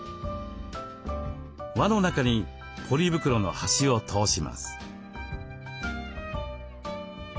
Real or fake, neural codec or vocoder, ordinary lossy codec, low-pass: real; none; none; none